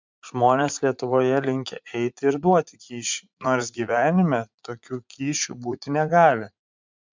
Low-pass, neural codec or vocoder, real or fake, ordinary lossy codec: 7.2 kHz; vocoder, 44.1 kHz, 80 mel bands, Vocos; fake; MP3, 64 kbps